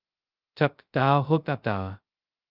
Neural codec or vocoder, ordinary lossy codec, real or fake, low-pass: codec, 16 kHz, 0.2 kbps, FocalCodec; Opus, 24 kbps; fake; 5.4 kHz